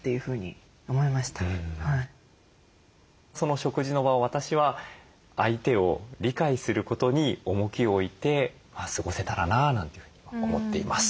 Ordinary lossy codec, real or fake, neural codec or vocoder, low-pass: none; real; none; none